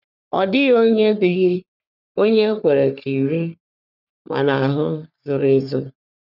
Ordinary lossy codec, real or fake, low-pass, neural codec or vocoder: none; fake; 5.4 kHz; codec, 44.1 kHz, 3.4 kbps, Pupu-Codec